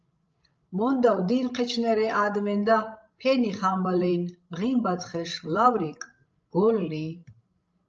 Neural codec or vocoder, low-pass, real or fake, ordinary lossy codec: codec, 16 kHz, 16 kbps, FreqCodec, larger model; 7.2 kHz; fake; Opus, 24 kbps